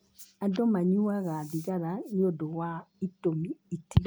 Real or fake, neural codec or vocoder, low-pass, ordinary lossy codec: real; none; none; none